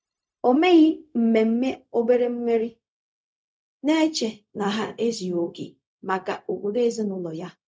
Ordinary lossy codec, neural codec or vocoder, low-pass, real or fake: none; codec, 16 kHz, 0.4 kbps, LongCat-Audio-Codec; none; fake